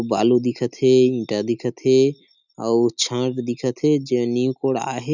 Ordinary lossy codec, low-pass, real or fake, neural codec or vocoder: none; 7.2 kHz; real; none